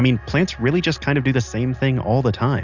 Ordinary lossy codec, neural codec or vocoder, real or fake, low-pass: Opus, 64 kbps; none; real; 7.2 kHz